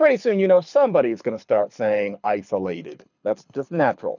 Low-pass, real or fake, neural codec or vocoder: 7.2 kHz; fake; codec, 24 kHz, 6 kbps, HILCodec